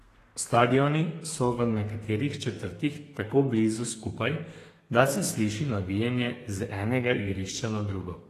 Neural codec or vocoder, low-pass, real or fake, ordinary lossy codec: codec, 32 kHz, 1.9 kbps, SNAC; 14.4 kHz; fake; AAC, 48 kbps